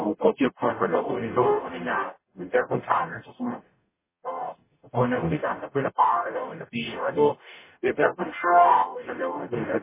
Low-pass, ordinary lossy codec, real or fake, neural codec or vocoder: 3.6 kHz; MP3, 16 kbps; fake; codec, 44.1 kHz, 0.9 kbps, DAC